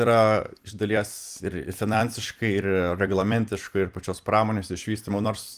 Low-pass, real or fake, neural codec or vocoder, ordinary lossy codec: 14.4 kHz; fake; vocoder, 44.1 kHz, 128 mel bands every 256 samples, BigVGAN v2; Opus, 32 kbps